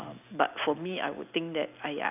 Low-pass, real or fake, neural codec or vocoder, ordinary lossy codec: 3.6 kHz; real; none; none